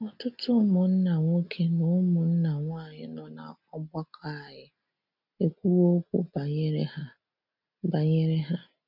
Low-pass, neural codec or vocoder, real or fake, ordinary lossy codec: 5.4 kHz; none; real; none